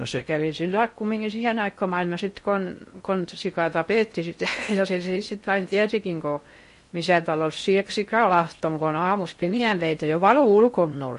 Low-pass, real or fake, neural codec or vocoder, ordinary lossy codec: 10.8 kHz; fake; codec, 16 kHz in and 24 kHz out, 0.6 kbps, FocalCodec, streaming, 4096 codes; MP3, 48 kbps